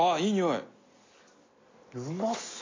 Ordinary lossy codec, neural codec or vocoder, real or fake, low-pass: AAC, 32 kbps; none; real; 7.2 kHz